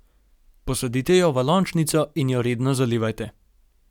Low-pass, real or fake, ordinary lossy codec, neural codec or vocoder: 19.8 kHz; real; none; none